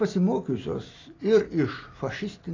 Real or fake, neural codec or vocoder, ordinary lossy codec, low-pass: real; none; AAC, 32 kbps; 7.2 kHz